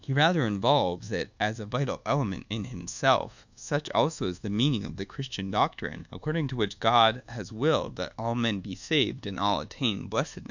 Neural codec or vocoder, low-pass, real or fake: codec, 24 kHz, 1.2 kbps, DualCodec; 7.2 kHz; fake